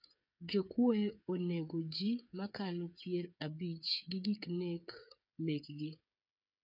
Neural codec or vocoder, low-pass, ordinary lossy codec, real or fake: codec, 16 kHz, 8 kbps, FreqCodec, smaller model; 5.4 kHz; none; fake